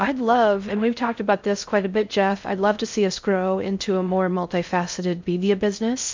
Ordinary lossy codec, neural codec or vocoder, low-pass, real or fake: MP3, 64 kbps; codec, 16 kHz in and 24 kHz out, 0.6 kbps, FocalCodec, streaming, 4096 codes; 7.2 kHz; fake